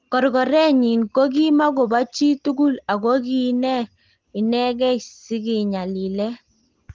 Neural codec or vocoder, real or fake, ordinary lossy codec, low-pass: none; real; Opus, 16 kbps; 7.2 kHz